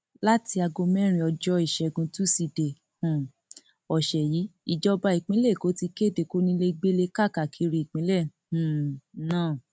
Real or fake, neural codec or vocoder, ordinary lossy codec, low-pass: real; none; none; none